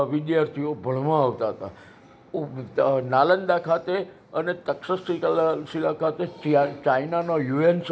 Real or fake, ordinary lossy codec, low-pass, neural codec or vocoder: real; none; none; none